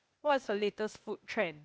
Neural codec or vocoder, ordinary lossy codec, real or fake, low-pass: codec, 16 kHz, 0.8 kbps, ZipCodec; none; fake; none